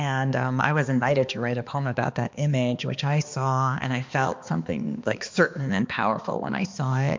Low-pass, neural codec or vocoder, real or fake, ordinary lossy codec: 7.2 kHz; codec, 16 kHz, 2 kbps, X-Codec, HuBERT features, trained on balanced general audio; fake; MP3, 64 kbps